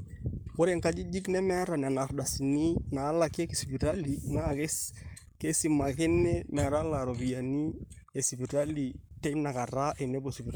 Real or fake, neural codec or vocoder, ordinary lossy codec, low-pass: fake; codec, 44.1 kHz, 7.8 kbps, Pupu-Codec; none; none